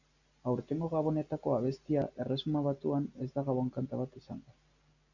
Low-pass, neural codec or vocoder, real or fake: 7.2 kHz; none; real